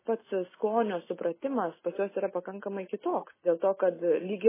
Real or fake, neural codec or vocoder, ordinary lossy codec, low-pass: real; none; MP3, 16 kbps; 3.6 kHz